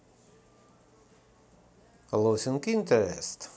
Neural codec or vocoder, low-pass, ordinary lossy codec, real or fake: none; none; none; real